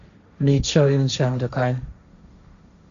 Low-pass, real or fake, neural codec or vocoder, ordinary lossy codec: 7.2 kHz; fake; codec, 16 kHz, 1.1 kbps, Voila-Tokenizer; Opus, 64 kbps